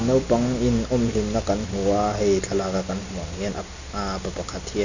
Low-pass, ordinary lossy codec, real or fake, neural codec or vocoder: 7.2 kHz; none; real; none